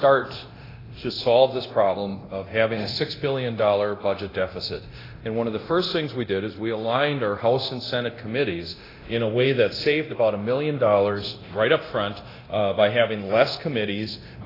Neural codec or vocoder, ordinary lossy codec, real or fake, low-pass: codec, 24 kHz, 0.9 kbps, DualCodec; AAC, 24 kbps; fake; 5.4 kHz